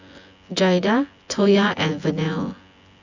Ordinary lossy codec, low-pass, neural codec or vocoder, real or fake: Opus, 64 kbps; 7.2 kHz; vocoder, 24 kHz, 100 mel bands, Vocos; fake